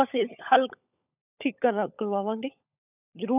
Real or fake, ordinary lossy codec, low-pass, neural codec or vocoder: fake; none; 3.6 kHz; codec, 16 kHz, 16 kbps, FunCodec, trained on LibriTTS, 50 frames a second